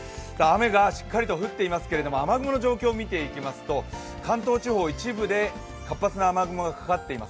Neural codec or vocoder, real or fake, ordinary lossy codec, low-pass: none; real; none; none